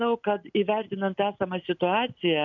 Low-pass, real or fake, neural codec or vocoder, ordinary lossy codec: 7.2 kHz; real; none; MP3, 64 kbps